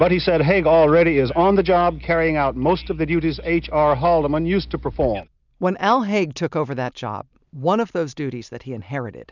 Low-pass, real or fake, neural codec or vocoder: 7.2 kHz; real; none